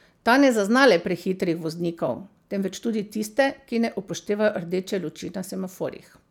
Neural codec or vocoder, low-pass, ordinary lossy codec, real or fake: none; 19.8 kHz; none; real